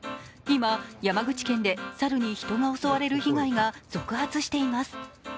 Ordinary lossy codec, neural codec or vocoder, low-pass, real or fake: none; none; none; real